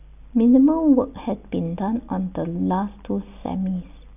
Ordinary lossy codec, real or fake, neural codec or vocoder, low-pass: none; real; none; 3.6 kHz